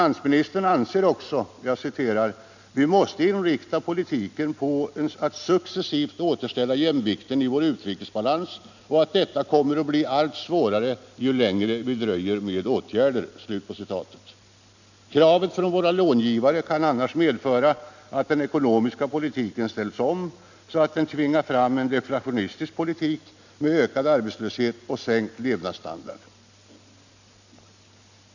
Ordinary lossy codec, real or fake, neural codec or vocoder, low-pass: none; real; none; 7.2 kHz